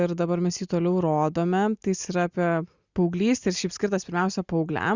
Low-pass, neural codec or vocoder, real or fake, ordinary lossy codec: 7.2 kHz; none; real; Opus, 64 kbps